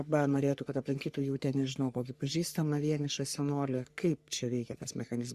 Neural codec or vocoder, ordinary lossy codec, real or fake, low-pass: codec, 44.1 kHz, 3.4 kbps, Pupu-Codec; Opus, 64 kbps; fake; 14.4 kHz